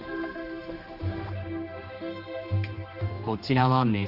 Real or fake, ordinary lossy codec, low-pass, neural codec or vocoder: fake; Opus, 24 kbps; 5.4 kHz; codec, 16 kHz, 2 kbps, X-Codec, HuBERT features, trained on general audio